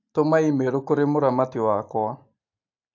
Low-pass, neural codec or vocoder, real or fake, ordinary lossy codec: 7.2 kHz; none; real; AAC, 48 kbps